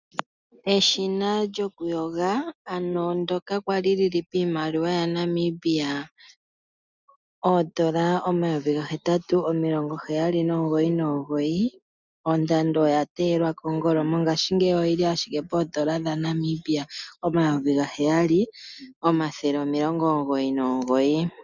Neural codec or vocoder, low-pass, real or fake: none; 7.2 kHz; real